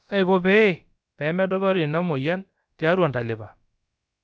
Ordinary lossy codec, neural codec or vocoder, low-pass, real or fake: none; codec, 16 kHz, about 1 kbps, DyCAST, with the encoder's durations; none; fake